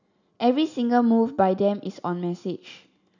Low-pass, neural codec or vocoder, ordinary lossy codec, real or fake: 7.2 kHz; none; none; real